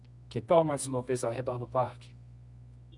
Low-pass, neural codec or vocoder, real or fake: 10.8 kHz; codec, 24 kHz, 0.9 kbps, WavTokenizer, medium music audio release; fake